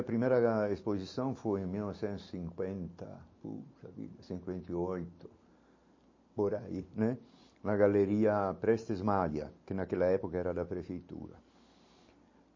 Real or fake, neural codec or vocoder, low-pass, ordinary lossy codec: real; none; 7.2 kHz; MP3, 32 kbps